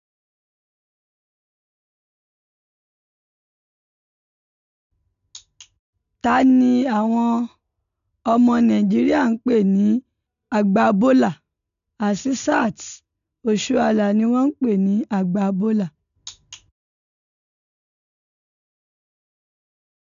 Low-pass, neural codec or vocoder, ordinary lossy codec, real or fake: 7.2 kHz; none; none; real